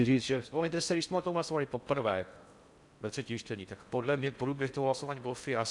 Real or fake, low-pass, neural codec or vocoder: fake; 10.8 kHz; codec, 16 kHz in and 24 kHz out, 0.6 kbps, FocalCodec, streaming, 4096 codes